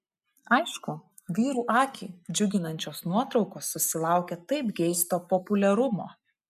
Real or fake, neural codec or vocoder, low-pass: real; none; 14.4 kHz